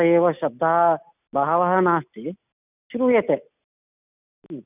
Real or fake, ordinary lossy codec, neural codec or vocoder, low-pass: real; none; none; 3.6 kHz